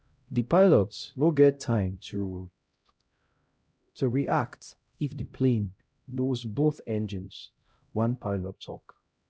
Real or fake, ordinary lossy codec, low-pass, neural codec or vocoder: fake; none; none; codec, 16 kHz, 0.5 kbps, X-Codec, HuBERT features, trained on LibriSpeech